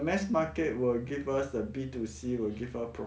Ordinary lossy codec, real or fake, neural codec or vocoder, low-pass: none; real; none; none